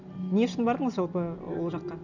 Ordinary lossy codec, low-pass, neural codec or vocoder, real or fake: none; 7.2 kHz; none; real